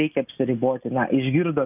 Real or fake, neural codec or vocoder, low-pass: real; none; 3.6 kHz